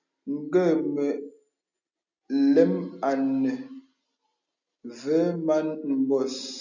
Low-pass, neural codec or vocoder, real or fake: 7.2 kHz; none; real